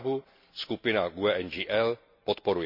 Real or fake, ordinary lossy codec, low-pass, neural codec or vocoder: real; MP3, 48 kbps; 5.4 kHz; none